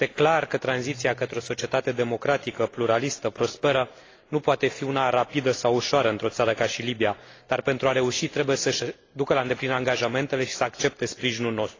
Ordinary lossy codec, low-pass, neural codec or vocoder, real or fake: AAC, 32 kbps; 7.2 kHz; none; real